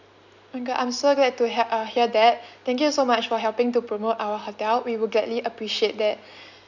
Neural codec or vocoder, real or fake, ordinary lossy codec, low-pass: none; real; none; 7.2 kHz